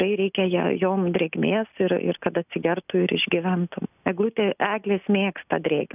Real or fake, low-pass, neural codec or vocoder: real; 3.6 kHz; none